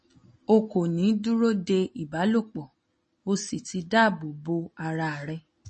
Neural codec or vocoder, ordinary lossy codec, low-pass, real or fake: none; MP3, 32 kbps; 10.8 kHz; real